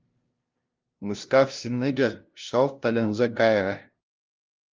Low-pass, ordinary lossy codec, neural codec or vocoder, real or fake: 7.2 kHz; Opus, 32 kbps; codec, 16 kHz, 0.5 kbps, FunCodec, trained on LibriTTS, 25 frames a second; fake